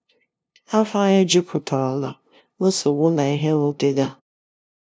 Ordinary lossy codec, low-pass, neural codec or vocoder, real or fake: none; none; codec, 16 kHz, 0.5 kbps, FunCodec, trained on LibriTTS, 25 frames a second; fake